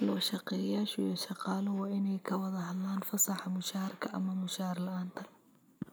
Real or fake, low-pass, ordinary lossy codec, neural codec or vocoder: real; none; none; none